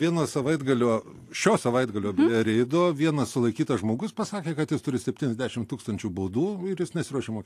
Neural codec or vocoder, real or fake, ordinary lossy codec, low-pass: none; real; AAC, 64 kbps; 14.4 kHz